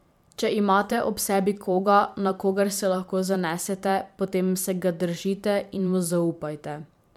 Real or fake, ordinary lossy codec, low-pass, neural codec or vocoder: fake; MP3, 96 kbps; 19.8 kHz; vocoder, 44.1 kHz, 128 mel bands every 512 samples, BigVGAN v2